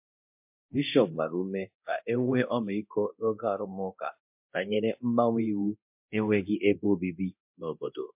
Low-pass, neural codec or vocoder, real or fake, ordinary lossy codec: 3.6 kHz; codec, 24 kHz, 0.9 kbps, DualCodec; fake; MP3, 32 kbps